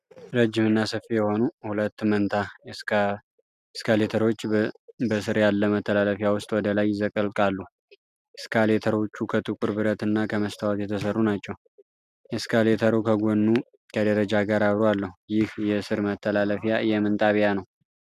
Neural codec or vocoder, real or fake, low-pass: none; real; 14.4 kHz